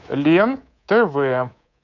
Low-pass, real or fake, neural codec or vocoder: 7.2 kHz; fake; codec, 24 kHz, 3.1 kbps, DualCodec